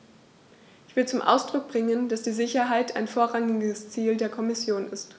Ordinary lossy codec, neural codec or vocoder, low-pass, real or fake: none; none; none; real